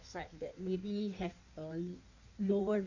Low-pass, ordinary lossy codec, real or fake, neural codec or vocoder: 7.2 kHz; none; fake; codec, 16 kHz in and 24 kHz out, 1.1 kbps, FireRedTTS-2 codec